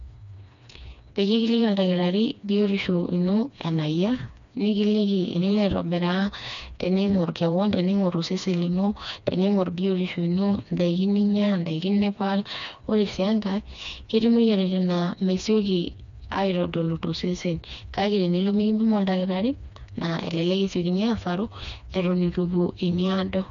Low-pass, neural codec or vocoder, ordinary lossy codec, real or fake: 7.2 kHz; codec, 16 kHz, 2 kbps, FreqCodec, smaller model; none; fake